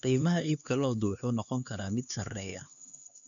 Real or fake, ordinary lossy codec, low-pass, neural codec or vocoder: fake; none; 7.2 kHz; codec, 16 kHz, 2 kbps, X-Codec, HuBERT features, trained on LibriSpeech